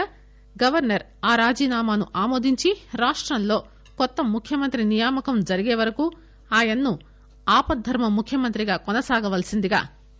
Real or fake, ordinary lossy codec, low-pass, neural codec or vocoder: real; none; none; none